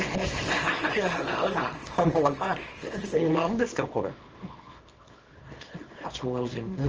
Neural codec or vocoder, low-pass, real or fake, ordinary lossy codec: codec, 24 kHz, 0.9 kbps, WavTokenizer, small release; 7.2 kHz; fake; Opus, 24 kbps